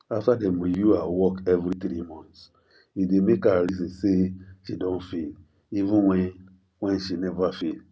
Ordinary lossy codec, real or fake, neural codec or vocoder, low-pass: none; real; none; none